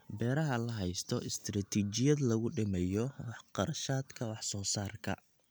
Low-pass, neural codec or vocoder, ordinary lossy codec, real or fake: none; none; none; real